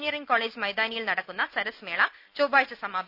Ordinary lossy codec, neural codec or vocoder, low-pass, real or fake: none; none; 5.4 kHz; real